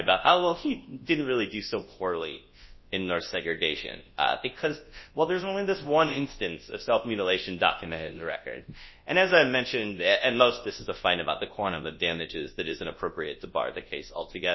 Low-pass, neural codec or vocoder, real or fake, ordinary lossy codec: 7.2 kHz; codec, 24 kHz, 0.9 kbps, WavTokenizer, large speech release; fake; MP3, 24 kbps